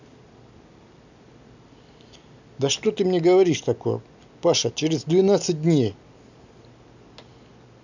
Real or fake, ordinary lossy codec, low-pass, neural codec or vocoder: real; none; 7.2 kHz; none